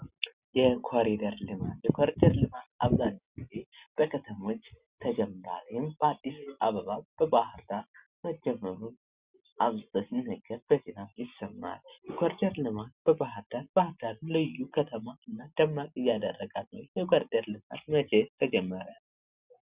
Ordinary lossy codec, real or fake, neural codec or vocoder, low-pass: Opus, 64 kbps; real; none; 3.6 kHz